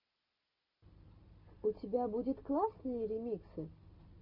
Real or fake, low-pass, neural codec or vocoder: real; 5.4 kHz; none